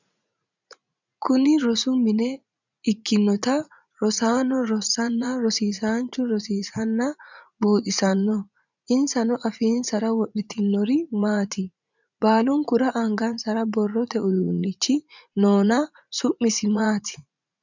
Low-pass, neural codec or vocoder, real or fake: 7.2 kHz; vocoder, 44.1 kHz, 80 mel bands, Vocos; fake